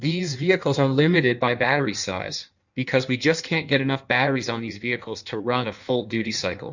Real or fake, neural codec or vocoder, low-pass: fake; codec, 16 kHz in and 24 kHz out, 1.1 kbps, FireRedTTS-2 codec; 7.2 kHz